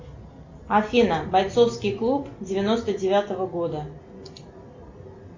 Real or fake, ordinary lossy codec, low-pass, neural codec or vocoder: real; MP3, 64 kbps; 7.2 kHz; none